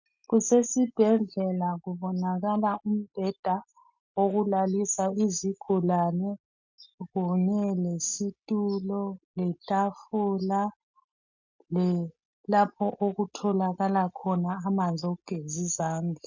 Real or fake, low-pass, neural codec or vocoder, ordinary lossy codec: real; 7.2 kHz; none; MP3, 64 kbps